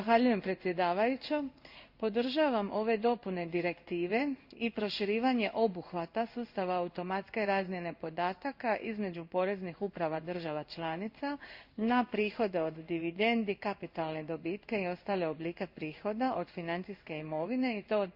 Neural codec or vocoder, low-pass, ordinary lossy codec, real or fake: codec, 16 kHz in and 24 kHz out, 1 kbps, XY-Tokenizer; 5.4 kHz; Opus, 64 kbps; fake